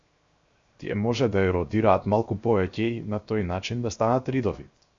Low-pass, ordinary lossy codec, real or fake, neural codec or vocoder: 7.2 kHz; Opus, 64 kbps; fake; codec, 16 kHz, 0.7 kbps, FocalCodec